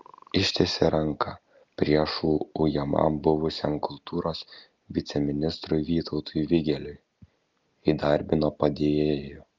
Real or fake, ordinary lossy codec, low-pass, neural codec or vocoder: real; Opus, 32 kbps; 7.2 kHz; none